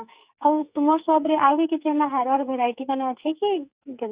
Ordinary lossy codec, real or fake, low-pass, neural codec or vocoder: Opus, 64 kbps; fake; 3.6 kHz; codec, 44.1 kHz, 2.6 kbps, SNAC